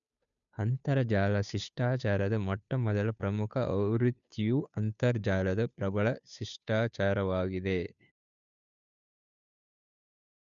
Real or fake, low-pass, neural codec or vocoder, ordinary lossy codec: fake; 7.2 kHz; codec, 16 kHz, 2 kbps, FunCodec, trained on Chinese and English, 25 frames a second; none